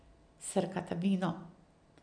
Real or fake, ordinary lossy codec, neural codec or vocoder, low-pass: real; none; none; 9.9 kHz